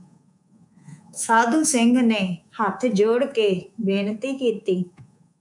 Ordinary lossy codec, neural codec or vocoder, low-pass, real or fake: MP3, 96 kbps; codec, 24 kHz, 3.1 kbps, DualCodec; 10.8 kHz; fake